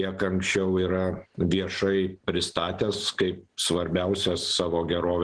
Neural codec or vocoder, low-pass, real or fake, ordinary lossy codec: none; 9.9 kHz; real; Opus, 16 kbps